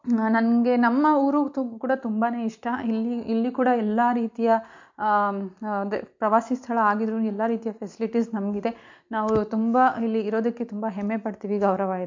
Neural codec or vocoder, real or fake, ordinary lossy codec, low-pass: none; real; MP3, 48 kbps; 7.2 kHz